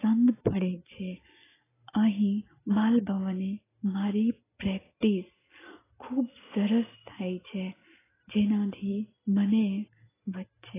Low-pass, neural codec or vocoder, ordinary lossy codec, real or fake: 3.6 kHz; none; AAC, 16 kbps; real